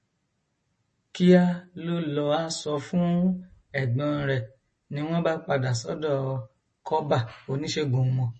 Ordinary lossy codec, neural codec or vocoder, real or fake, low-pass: MP3, 32 kbps; none; real; 10.8 kHz